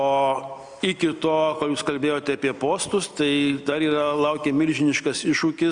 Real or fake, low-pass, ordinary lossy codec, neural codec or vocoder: real; 9.9 kHz; Opus, 64 kbps; none